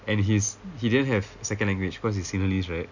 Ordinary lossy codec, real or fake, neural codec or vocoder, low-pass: none; real; none; 7.2 kHz